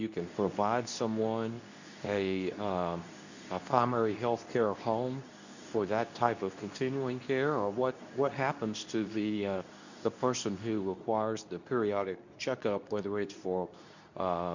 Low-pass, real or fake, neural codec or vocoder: 7.2 kHz; fake; codec, 24 kHz, 0.9 kbps, WavTokenizer, medium speech release version 2